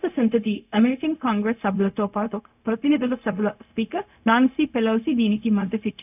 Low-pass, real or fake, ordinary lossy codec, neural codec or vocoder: 3.6 kHz; fake; none; codec, 16 kHz, 0.4 kbps, LongCat-Audio-Codec